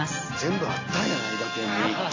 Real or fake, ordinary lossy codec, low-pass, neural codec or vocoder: real; AAC, 32 kbps; 7.2 kHz; none